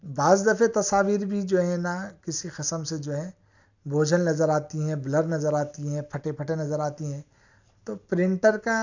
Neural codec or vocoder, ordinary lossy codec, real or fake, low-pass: none; none; real; 7.2 kHz